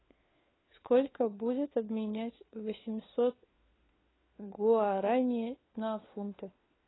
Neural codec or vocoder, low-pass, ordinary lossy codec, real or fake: codec, 16 kHz, 4 kbps, FunCodec, trained on LibriTTS, 50 frames a second; 7.2 kHz; AAC, 16 kbps; fake